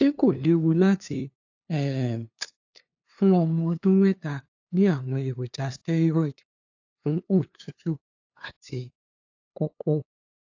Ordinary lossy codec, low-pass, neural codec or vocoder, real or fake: AAC, 48 kbps; 7.2 kHz; codec, 16 kHz, 2 kbps, FunCodec, trained on LibriTTS, 25 frames a second; fake